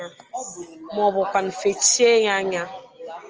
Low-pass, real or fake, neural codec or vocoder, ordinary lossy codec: 7.2 kHz; real; none; Opus, 24 kbps